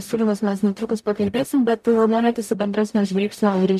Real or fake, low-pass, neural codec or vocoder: fake; 14.4 kHz; codec, 44.1 kHz, 0.9 kbps, DAC